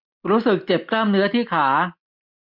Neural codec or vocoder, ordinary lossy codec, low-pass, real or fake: none; MP3, 48 kbps; 5.4 kHz; real